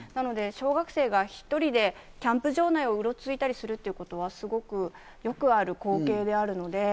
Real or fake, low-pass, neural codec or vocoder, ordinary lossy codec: real; none; none; none